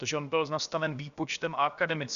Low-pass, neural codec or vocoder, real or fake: 7.2 kHz; codec, 16 kHz, about 1 kbps, DyCAST, with the encoder's durations; fake